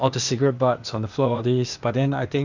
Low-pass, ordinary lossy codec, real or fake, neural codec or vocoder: 7.2 kHz; none; fake; codec, 16 kHz, 0.8 kbps, ZipCodec